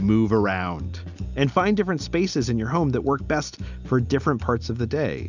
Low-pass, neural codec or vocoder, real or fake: 7.2 kHz; none; real